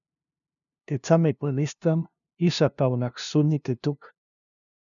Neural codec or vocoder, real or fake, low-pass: codec, 16 kHz, 0.5 kbps, FunCodec, trained on LibriTTS, 25 frames a second; fake; 7.2 kHz